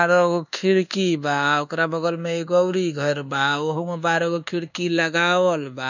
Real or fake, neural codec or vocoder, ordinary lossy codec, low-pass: fake; codec, 24 kHz, 1.2 kbps, DualCodec; none; 7.2 kHz